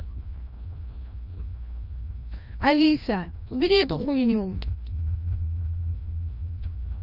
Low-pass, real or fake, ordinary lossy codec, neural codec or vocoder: 5.4 kHz; fake; none; codec, 16 kHz, 1 kbps, FreqCodec, larger model